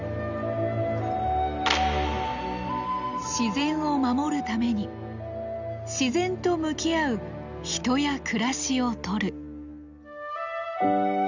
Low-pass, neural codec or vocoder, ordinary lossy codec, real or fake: 7.2 kHz; none; none; real